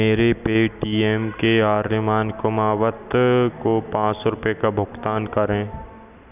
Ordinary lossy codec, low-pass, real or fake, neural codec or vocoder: none; 3.6 kHz; real; none